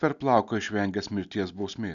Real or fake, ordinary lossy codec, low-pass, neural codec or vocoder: real; MP3, 96 kbps; 7.2 kHz; none